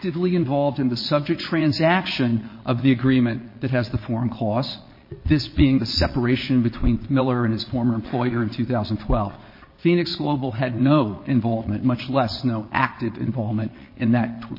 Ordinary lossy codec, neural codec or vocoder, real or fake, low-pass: MP3, 24 kbps; vocoder, 22.05 kHz, 80 mel bands, Vocos; fake; 5.4 kHz